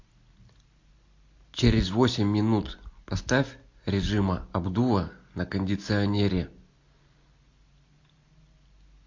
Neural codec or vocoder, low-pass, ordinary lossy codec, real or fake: none; 7.2 kHz; MP3, 48 kbps; real